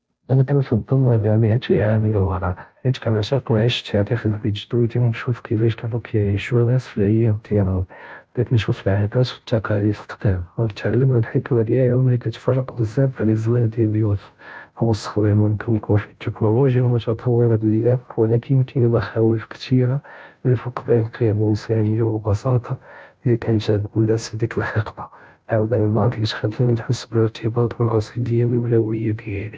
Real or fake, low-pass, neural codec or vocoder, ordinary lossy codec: fake; none; codec, 16 kHz, 0.5 kbps, FunCodec, trained on Chinese and English, 25 frames a second; none